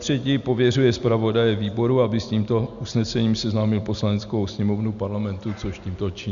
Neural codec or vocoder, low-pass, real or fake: none; 7.2 kHz; real